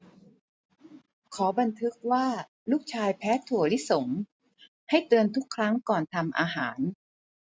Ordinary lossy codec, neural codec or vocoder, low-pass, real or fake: none; none; none; real